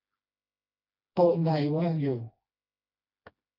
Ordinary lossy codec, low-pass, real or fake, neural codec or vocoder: AAC, 48 kbps; 5.4 kHz; fake; codec, 16 kHz, 1 kbps, FreqCodec, smaller model